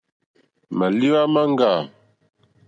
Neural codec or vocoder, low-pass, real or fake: none; 9.9 kHz; real